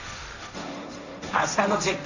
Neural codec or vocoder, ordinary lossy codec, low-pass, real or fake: codec, 16 kHz, 1.1 kbps, Voila-Tokenizer; none; 7.2 kHz; fake